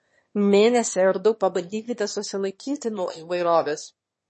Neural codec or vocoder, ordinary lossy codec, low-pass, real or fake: autoencoder, 22.05 kHz, a latent of 192 numbers a frame, VITS, trained on one speaker; MP3, 32 kbps; 9.9 kHz; fake